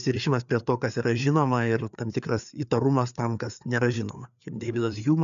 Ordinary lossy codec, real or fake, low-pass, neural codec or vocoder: AAC, 96 kbps; fake; 7.2 kHz; codec, 16 kHz, 4 kbps, FreqCodec, larger model